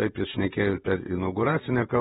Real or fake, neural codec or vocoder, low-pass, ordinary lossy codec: fake; vocoder, 48 kHz, 128 mel bands, Vocos; 19.8 kHz; AAC, 16 kbps